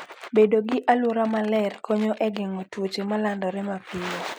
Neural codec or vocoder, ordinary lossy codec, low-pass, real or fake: none; none; none; real